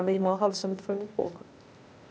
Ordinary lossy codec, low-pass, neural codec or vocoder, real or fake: none; none; codec, 16 kHz, 0.8 kbps, ZipCodec; fake